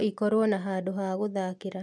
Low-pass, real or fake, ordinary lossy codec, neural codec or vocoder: none; real; none; none